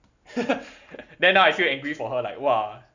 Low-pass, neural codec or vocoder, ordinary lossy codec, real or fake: 7.2 kHz; none; none; real